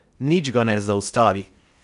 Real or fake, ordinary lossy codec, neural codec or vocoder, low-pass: fake; none; codec, 16 kHz in and 24 kHz out, 0.8 kbps, FocalCodec, streaming, 65536 codes; 10.8 kHz